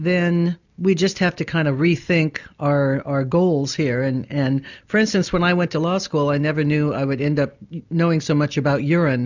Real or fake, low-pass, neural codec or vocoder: real; 7.2 kHz; none